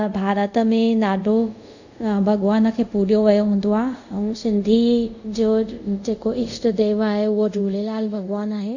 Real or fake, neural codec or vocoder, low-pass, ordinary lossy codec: fake; codec, 24 kHz, 0.5 kbps, DualCodec; 7.2 kHz; none